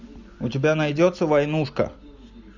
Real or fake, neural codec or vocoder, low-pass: real; none; 7.2 kHz